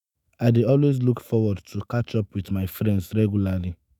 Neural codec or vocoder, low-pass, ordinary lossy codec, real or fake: autoencoder, 48 kHz, 128 numbers a frame, DAC-VAE, trained on Japanese speech; none; none; fake